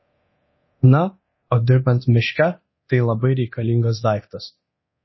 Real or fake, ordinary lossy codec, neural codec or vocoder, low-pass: fake; MP3, 24 kbps; codec, 24 kHz, 0.9 kbps, DualCodec; 7.2 kHz